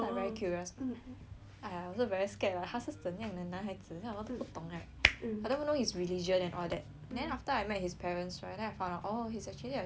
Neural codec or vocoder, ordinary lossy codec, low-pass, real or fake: none; none; none; real